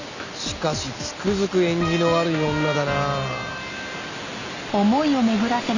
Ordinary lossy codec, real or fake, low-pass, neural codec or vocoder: none; real; 7.2 kHz; none